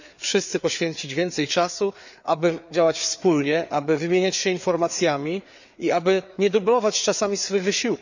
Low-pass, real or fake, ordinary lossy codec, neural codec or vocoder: 7.2 kHz; fake; none; codec, 16 kHz, 4 kbps, FreqCodec, larger model